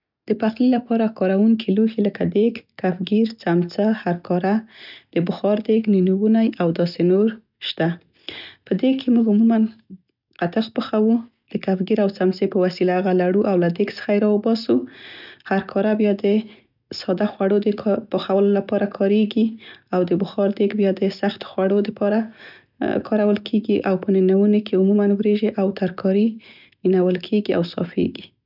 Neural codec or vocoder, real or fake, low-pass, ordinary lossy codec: none; real; 5.4 kHz; none